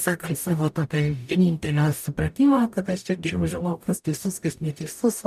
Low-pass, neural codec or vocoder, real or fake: 14.4 kHz; codec, 44.1 kHz, 0.9 kbps, DAC; fake